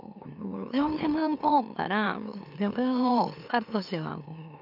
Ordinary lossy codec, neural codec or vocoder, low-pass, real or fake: none; autoencoder, 44.1 kHz, a latent of 192 numbers a frame, MeloTTS; 5.4 kHz; fake